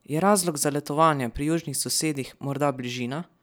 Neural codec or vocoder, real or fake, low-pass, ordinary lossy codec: none; real; none; none